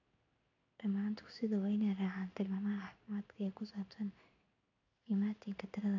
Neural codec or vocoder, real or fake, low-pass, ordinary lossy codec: codec, 16 kHz in and 24 kHz out, 1 kbps, XY-Tokenizer; fake; 7.2 kHz; none